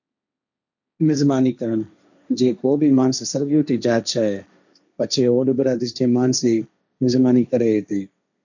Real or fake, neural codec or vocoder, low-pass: fake; codec, 16 kHz, 1.1 kbps, Voila-Tokenizer; 7.2 kHz